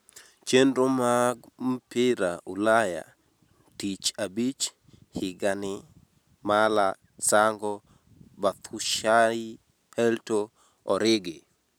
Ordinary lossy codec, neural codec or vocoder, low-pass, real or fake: none; none; none; real